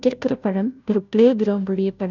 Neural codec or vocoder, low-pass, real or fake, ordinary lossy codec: codec, 16 kHz, 0.5 kbps, FunCodec, trained on Chinese and English, 25 frames a second; 7.2 kHz; fake; none